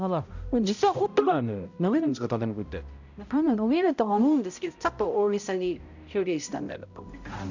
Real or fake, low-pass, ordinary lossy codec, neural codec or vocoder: fake; 7.2 kHz; none; codec, 16 kHz, 0.5 kbps, X-Codec, HuBERT features, trained on balanced general audio